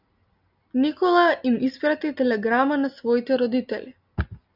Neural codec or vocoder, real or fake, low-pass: none; real; 5.4 kHz